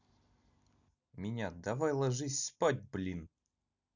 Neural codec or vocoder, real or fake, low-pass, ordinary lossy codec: none; real; 7.2 kHz; none